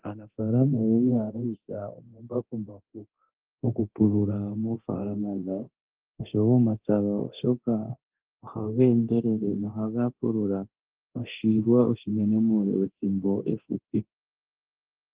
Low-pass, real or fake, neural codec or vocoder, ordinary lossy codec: 3.6 kHz; fake; codec, 24 kHz, 0.9 kbps, DualCodec; Opus, 16 kbps